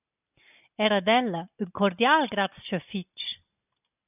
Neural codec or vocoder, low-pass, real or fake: none; 3.6 kHz; real